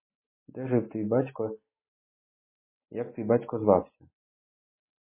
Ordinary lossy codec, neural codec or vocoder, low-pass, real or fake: MP3, 16 kbps; none; 3.6 kHz; real